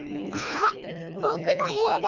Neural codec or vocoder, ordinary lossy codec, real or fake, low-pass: codec, 24 kHz, 1.5 kbps, HILCodec; none; fake; 7.2 kHz